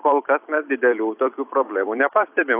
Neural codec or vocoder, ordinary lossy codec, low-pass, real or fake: vocoder, 44.1 kHz, 128 mel bands every 256 samples, BigVGAN v2; AAC, 32 kbps; 3.6 kHz; fake